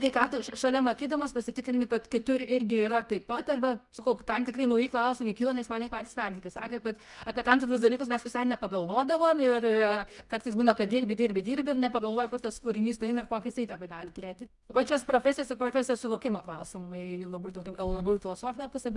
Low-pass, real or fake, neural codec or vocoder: 10.8 kHz; fake; codec, 24 kHz, 0.9 kbps, WavTokenizer, medium music audio release